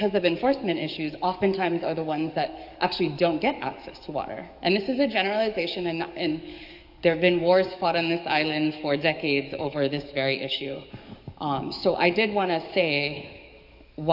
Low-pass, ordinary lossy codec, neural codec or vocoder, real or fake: 5.4 kHz; AAC, 48 kbps; codec, 44.1 kHz, 7.8 kbps, DAC; fake